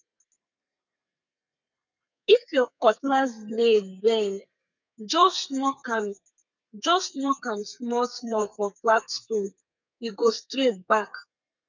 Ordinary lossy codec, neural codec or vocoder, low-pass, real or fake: none; codec, 32 kHz, 1.9 kbps, SNAC; 7.2 kHz; fake